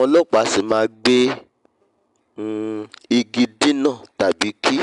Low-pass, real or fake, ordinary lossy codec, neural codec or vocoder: 10.8 kHz; real; MP3, 96 kbps; none